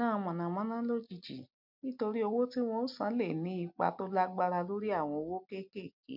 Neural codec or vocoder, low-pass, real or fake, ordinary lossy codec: none; 5.4 kHz; real; MP3, 48 kbps